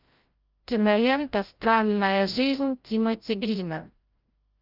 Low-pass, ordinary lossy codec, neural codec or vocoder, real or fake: 5.4 kHz; Opus, 24 kbps; codec, 16 kHz, 0.5 kbps, FreqCodec, larger model; fake